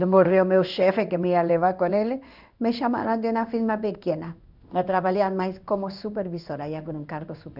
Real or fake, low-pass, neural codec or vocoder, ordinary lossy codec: fake; 5.4 kHz; codec, 16 kHz in and 24 kHz out, 1 kbps, XY-Tokenizer; none